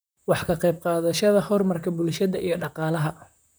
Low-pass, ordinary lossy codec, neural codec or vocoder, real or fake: none; none; vocoder, 44.1 kHz, 128 mel bands, Pupu-Vocoder; fake